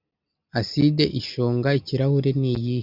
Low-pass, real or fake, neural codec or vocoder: 5.4 kHz; real; none